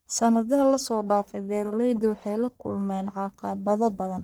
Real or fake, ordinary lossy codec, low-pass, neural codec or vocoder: fake; none; none; codec, 44.1 kHz, 1.7 kbps, Pupu-Codec